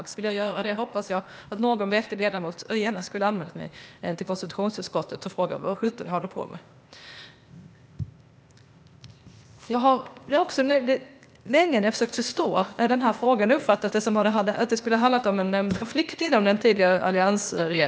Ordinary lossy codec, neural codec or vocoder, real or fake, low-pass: none; codec, 16 kHz, 0.8 kbps, ZipCodec; fake; none